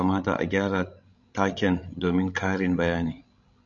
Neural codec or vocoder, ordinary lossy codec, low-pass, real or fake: codec, 16 kHz, 16 kbps, FreqCodec, larger model; MP3, 64 kbps; 7.2 kHz; fake